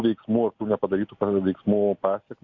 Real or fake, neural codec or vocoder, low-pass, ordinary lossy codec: real; none; 7.2 kHz; MP3, 64 kbps